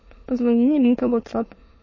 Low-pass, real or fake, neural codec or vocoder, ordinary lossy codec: 7.2 kHz; fake; autoencoder, 22.05 kHz, a latent of 192 numbers a frame, VITS, trained on many speakers; MP3, 32 kbps